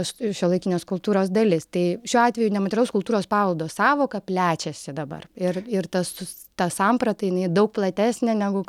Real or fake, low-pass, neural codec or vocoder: real; 19.8 kHz; none